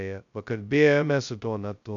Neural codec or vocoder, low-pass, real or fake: codec, 16 kHz, 0.2 kbps, FocalCodec; 7.2 kHz; fake